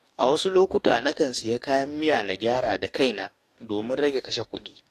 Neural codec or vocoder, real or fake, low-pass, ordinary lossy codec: codec, 44.1 kHz, 2.6 kbps, DAC; fake; 14.4 kHz; AAC, 64 kbps